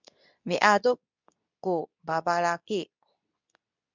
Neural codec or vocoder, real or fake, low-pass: codec, 24 kHz, 0.9 kbps, WavTokenizer, medium speech release version 2; fake; 7.2 kHz